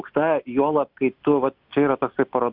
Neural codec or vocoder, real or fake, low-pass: none; real; 5.4 kHz